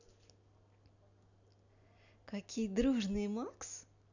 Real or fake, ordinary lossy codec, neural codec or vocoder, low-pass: real; none; none; 7.2 kHz